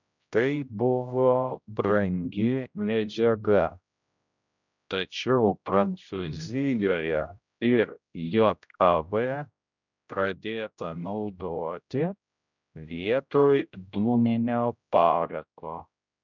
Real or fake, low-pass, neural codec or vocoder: fake; 7.2 kHz; codec, 16 kHz, 0.5 kbps, X-Codec, HuBERT features, trained on general audio